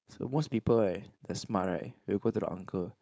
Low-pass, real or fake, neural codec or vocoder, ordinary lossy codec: none; fake; codec, 16 kHz, 4.8 kbps, FACodec; none